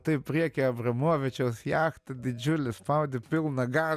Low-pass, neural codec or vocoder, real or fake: 14.4 kHz; none; real